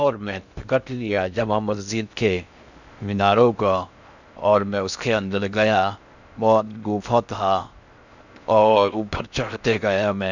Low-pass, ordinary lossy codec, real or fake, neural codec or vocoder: 7.2 kHz; none; fake; codec, 16 kHz in and 24 kHz out, 0.6 kbps, FocalCodec, streaming, 2048 codes